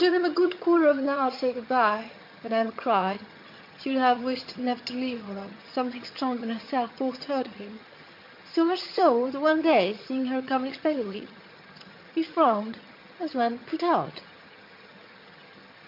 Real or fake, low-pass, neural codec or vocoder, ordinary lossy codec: fake; 5.4 kHz; vocoder, 22.05 kHz, 80 mel bands, HiFi-GAN; MP3, 32 kbps